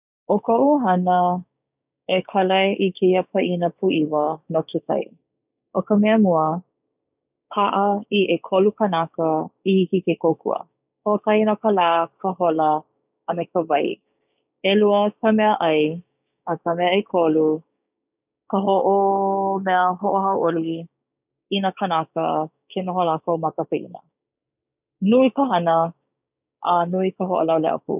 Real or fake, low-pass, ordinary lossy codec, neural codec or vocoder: fake; 3.6 kHz; none; codec, 16 kHz, 6 kbps, DAC